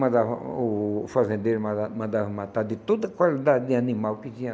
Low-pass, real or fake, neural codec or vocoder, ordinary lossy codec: none; real; none; none